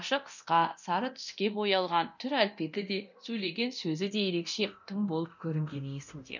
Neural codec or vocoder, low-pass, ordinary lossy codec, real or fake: codec, 24 kHz, 0.9 kbps, DualCodec; 7.2 kHz; none; fake